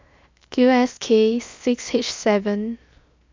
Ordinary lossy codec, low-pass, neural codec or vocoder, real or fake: MP3, 64 kbps; 7.2 kHz; codec, 16 kHz, 0.7 kbps, FocalCodec; fake